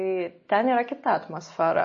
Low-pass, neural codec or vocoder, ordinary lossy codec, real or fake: 7.2 kHz; none; MP3, 32 kbps; real